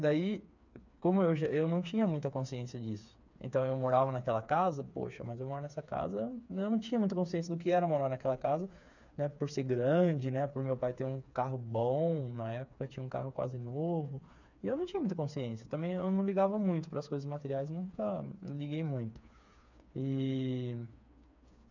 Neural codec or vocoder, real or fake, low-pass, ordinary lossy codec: codec, 16 kHz, 4 kbps, FreqCodec, smaller model; fake; 7.2 kHz; none